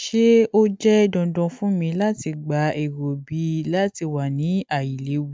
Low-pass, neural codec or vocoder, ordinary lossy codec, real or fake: none; none; none; real